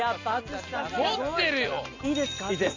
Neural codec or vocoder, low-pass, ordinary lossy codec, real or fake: none; 7.2 kHz; MP3, 48 kbps; real